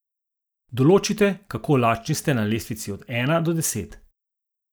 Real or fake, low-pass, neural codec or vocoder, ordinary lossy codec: real; none; none; none